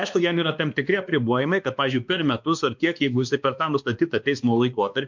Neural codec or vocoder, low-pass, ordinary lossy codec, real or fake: codec, 16 kHz, 2 kbps, X-Codec, WavLM features, trained on Multilingual LibriSpeech; 7.2 kHz; MP3, 64 kbps; fake